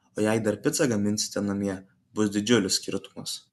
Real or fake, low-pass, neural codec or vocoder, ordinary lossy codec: real; 14.4 kHz; none; MP3, 96 kbps